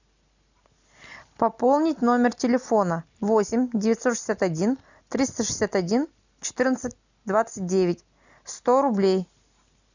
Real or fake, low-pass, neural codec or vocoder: real; 7.2 kHz; none